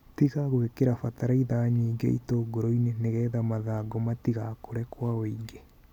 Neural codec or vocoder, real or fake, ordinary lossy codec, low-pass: none; real; none; 19.8 kHz